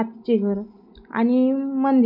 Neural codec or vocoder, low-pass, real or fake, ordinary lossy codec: none; 5.4 kHz; real; none